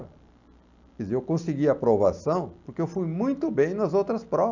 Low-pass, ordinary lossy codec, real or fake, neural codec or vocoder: 7.2 kHz; none; real; none